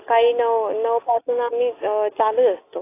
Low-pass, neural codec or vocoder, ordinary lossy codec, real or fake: 3.6 kHz; none; AAC, 24 kbps; real